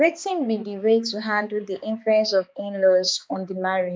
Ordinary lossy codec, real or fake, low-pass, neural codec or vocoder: none; fake; none; codec, 16 kHz, 2 kbps, X-Codec, HuBERT features, trained on balanced general audio